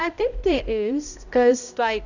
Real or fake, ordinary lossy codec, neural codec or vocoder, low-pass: fake; none; codec, 16 kHz, 0.5 kbps, X-Codec, HuBERT features, trained on balanced general audio; 7.2 kHz